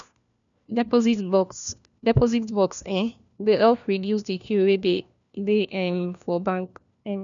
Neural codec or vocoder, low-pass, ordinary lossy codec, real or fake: codec, 16 kHz, 1 kbps, FunCodec, trained on LibriTTS, 50 frames a second; 7.2 kHz; none; fake